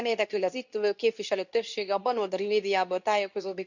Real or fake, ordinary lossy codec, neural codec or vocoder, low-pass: fake; none; codec, 24 kHz, 0.9 kbps, WavTokenizer, medium speech release version 2; 7.2 kHz